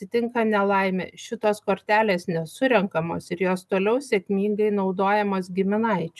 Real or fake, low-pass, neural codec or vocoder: real; 14.4 kHz; none